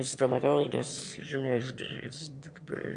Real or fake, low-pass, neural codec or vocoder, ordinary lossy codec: fake; 9.9 kHz; autoencoder, 22.05 kHz, a latent of 192 numbers a frame, VITS, trained on one speaker; AAC, 64 kbps